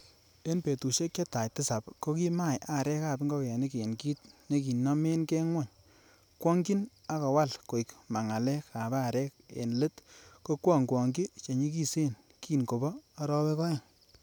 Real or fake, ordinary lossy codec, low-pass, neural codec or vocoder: real; none; none; none